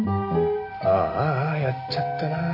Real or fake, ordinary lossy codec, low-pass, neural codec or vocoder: real; MP3, 48 kbps; 5.4 kHz; none